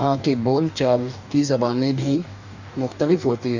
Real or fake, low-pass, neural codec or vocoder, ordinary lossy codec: fake; 7.2 kHz; codec, 44.1 kHz, 2.6 kbps, DAC; none